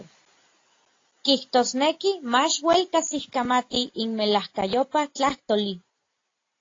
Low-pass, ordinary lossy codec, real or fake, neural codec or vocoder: 7.2 kHz; AAC, 32 kbps; real; none